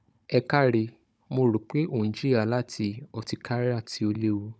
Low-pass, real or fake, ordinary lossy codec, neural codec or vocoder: none; fake; none; codec, 16 kHz, 16 kbps, FunCodec, trained on Chinese and English, 50 frames a second